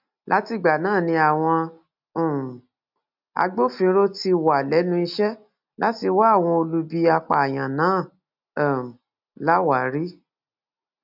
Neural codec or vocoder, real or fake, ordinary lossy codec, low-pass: none; real; none; 5.4 kHz